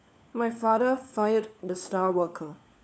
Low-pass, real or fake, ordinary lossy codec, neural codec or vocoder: none; fake; none; codec, 16 kHz, 4 kbps, FunCodec, trained on LibriTTS, 50 frames a second